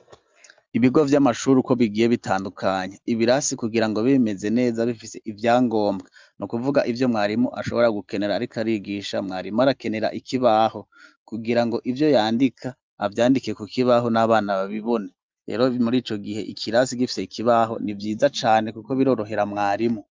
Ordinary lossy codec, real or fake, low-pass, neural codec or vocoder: Opus, 24 kbps; real; 7.2 kHz; none